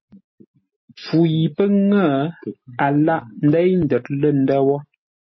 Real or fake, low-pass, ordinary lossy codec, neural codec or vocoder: real; 7.2 kHz; MP3, 24 kbps; none